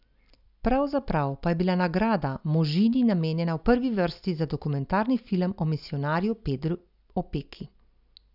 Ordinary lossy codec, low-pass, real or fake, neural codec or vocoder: none; 5.4 kHz; real; none